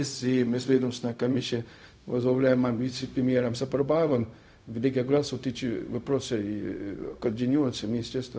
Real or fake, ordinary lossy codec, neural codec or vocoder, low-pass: fake; none; codec, 16 kHz, 0.4 kbps, LongCat-Audio-Codec; none